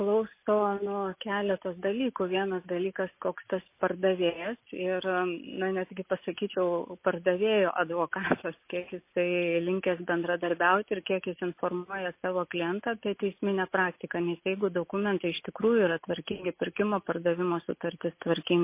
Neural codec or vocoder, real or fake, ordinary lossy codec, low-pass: none; real; MP3, 24 kbps; 3.6 kHz